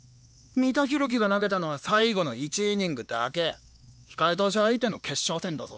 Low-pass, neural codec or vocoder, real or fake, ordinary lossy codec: none; codec, 16 kHz, 2 kbps, X-Codec, HuBERT features, trained on LibriSpeech; fake; none